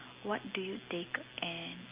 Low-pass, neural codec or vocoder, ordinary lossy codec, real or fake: 3.6 kHz; none; Opus, 32 kbps; real